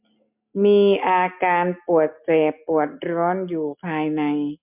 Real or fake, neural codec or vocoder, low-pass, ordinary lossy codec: real; none; 3.6 kHz; MP3, 32 kbps